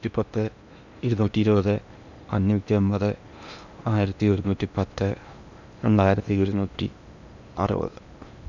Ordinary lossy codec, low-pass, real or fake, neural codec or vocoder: none; 7.2 kHz; fake; codec, 16 kHz in and 24 kHz out, 0.8 kbps, FocalCodec, streaming, 65536 codes